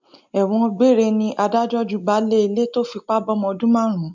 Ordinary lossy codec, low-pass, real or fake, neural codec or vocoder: MP3, 64 kbps; 7.2 kHz; real; none